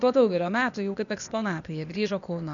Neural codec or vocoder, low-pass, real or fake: codec, 16 kHz, 0.8 kbps, ZipCodec; 7.2 kHz; fake